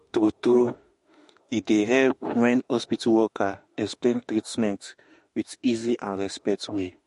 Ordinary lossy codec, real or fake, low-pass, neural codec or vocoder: MP3, 48 kbps; fake; 14.4 kHz; autoencoder, 48 kHz, 32 numbers a frame, DAC-VAE, trained on Japanese speech